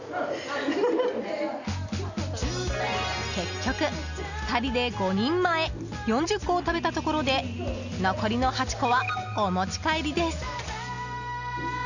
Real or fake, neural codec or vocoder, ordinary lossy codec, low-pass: real; none; none; 7.2 kHz